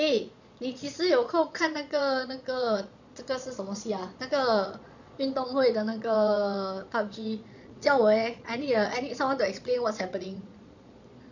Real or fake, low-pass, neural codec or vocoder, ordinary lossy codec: fake; 7.2 kHz; vocoder, 22.05 kHz, 80 mel bands, Vocos; none